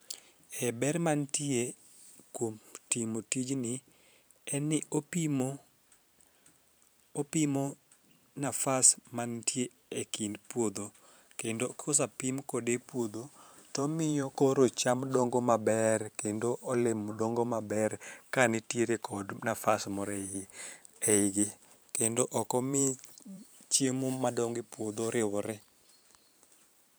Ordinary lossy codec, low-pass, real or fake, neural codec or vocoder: none; none; fake; vocoder, 44.1 kHz, 128 mel bands every 512 samples, BigVGAN v2